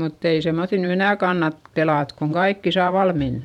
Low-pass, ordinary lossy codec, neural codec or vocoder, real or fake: 19.8 kHz; none; vocoder, 44.1 kHz, 128 mel bands every 512 samples, BigVGAN v2; fake